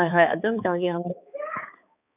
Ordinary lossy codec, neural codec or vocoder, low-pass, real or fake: none; codec, 16 kHz, 4 kbps, X-Codec, HuBERT features, trained on balanced general audio; 3.6 kHz; fake